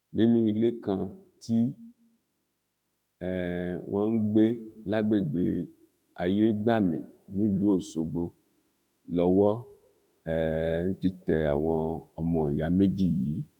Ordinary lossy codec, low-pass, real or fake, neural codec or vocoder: none; 19.8 kHz; fake; autoencoder, 48 kHz, 32 numbers a frame, DAC-VAE, trained on Japanese speech